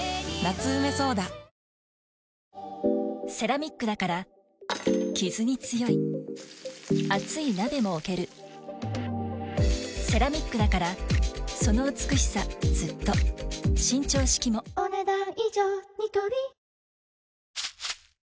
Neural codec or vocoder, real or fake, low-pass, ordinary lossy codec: none; real; none; none